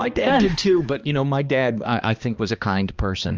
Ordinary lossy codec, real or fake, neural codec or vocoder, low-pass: Opus, 24 kbps; fake; codec, 16 kHz, 2 kbps, X-Codec, HuBERT features, trained on balanced general audio; 7.2 kHz